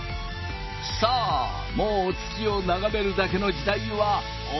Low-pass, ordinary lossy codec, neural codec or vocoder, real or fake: 7.2 kHz; MP3, 24 kbps; none; real